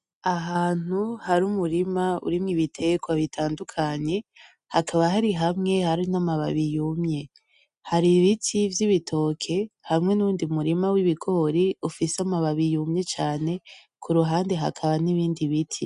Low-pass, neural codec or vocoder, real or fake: 10.8 kHz; none; real